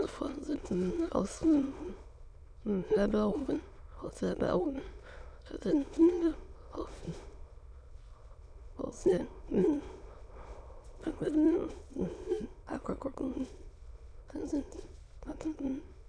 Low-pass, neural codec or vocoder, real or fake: 9.9 kHz; autoencoder, 22.05 kHz, a latent of 192 numbers a frame, VITS, trained on many speakers; fake